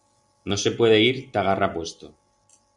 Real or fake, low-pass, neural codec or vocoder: real; 10.8 kHz; none